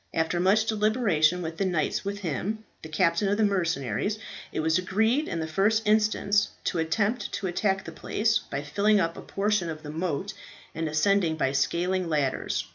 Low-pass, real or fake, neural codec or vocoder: 7.2 kHz; real; none